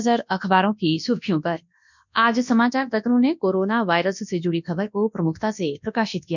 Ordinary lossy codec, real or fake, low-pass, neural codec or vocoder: none; fake; 7.2 kHz; codec, 24 kHz, 0.9 kbps, WavTokenizer, large speech release